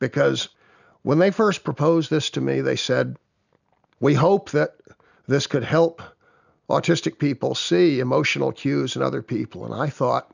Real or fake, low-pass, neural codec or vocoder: real; 7.2 kHz; none